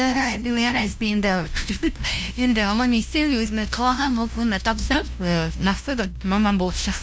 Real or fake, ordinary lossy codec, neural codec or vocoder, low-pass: fake; none; codec, 16 kHz, 0.5 kbps, FunCodec, trained on LibriTTS, 25 frames a second; none